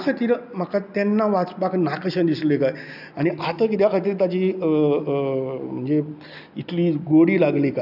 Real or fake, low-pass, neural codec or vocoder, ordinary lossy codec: real; 5.4 kHz; none; none